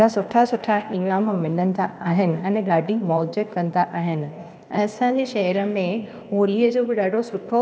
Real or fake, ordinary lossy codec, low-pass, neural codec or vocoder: fake; none; none; codec, 16 kHz, 0.8 kbps, ZipCodec